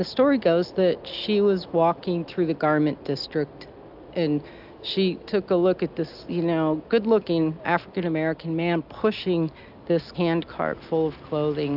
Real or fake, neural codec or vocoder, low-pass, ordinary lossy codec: real; none; 5.4 kHz; AAC, 48 kbps